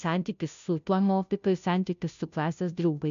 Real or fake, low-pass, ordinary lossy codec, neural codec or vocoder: fake; 7.2 kHz; MP3, 64 kbps; codec, 16 kHz, 0.5 kbps, FunCodec, trained on Chinese and English, 25 frames a second